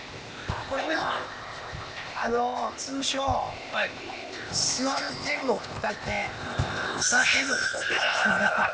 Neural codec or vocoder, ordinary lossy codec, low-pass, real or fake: codec, 16 kHz, 0.8 kbps, ZipCodec; none; none; fake